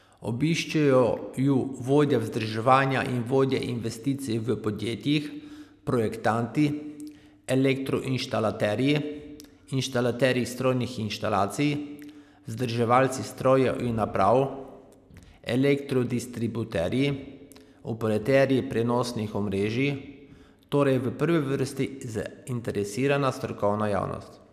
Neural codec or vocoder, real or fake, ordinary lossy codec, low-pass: none; real; none; 14.4 kHz